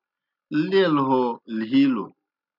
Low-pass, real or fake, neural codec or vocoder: 5.4 kHz; real; none